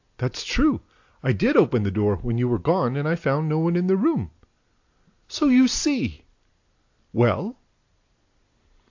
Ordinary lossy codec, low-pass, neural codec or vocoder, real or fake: AAC, 48 kbps; 7.2 kHz; none; real